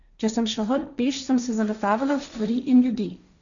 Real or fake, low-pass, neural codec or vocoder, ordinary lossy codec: fake; 7.2 kHz; codec, 16 kHz, 1.1 kbps, Voila-Tokenizer; none